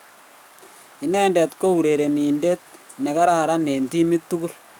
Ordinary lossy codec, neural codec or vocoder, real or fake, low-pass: none; codec, 44.1 kHz, 7.8 kbps, DAC; fake; none